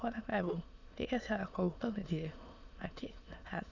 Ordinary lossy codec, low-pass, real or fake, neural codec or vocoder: none; 7.2 kHz; fake; autoencoder, 22.05 kHz, a latent of 192 numbers a frame, VITS, trained on many speakers